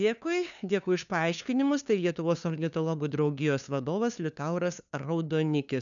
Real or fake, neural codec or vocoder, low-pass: fake; codec, 16 kHz, 2 kbps, FunCodec, trained on LibriTTS, 25 frames a second; 7.2 kHz